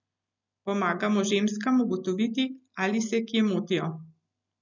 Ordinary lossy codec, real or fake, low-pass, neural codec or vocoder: none; real; 7.2 kHz; none